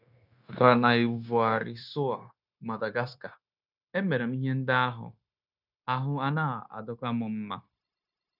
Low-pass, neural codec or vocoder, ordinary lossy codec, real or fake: 5.4 kHz; codec, 16 kHz, 0.9 kbps, LongCat-Audio-Codec; none; fake